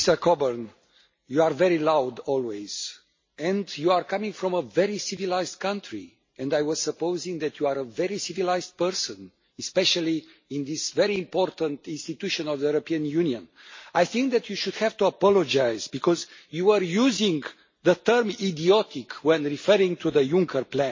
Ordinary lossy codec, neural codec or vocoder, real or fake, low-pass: MP3, 32 kbps; none; real; 7.2 kHz